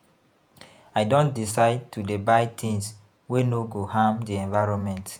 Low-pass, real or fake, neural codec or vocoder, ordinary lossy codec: none; fake; vocoder, 48 kHz, 128 mel bands, Vocos; none